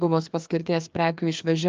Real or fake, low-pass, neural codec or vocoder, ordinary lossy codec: fake; 7.2 kHz; codec, 16 kHz, 2 kbps, FreqCodec, larger model; Opus, 24 kbps